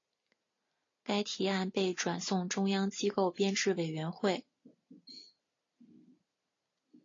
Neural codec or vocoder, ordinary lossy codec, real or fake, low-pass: none; AAC, 32 kbps; real; 7.2 kHz